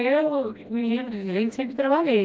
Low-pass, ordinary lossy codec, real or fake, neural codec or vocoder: none; none; fake; codec, 16 kHz, 1 kbps, FreqCodec, smaller model